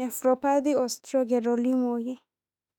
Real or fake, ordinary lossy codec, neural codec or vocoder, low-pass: fake; none; autoencoder, 48 kHz, 32 numbers a frame, DAC-VAE, trained on Japanese speech; 19.8 kHz